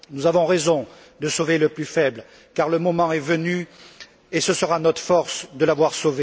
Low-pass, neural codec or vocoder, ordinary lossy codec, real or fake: none; none; none; real